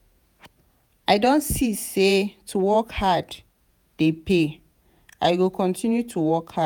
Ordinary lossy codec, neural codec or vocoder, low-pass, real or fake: none; vocoder, 48 kHz, 128 mel bands, Vocos; none; fake